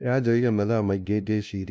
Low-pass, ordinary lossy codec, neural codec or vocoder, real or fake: none; none; codec, 16 kHz, 0.5 kbps, FunCodec, trained on LibriTTS, 25 frames a second; fake